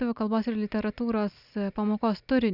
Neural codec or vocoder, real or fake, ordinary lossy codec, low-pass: none; real; Opus, 64 kbps; 5.4 kHz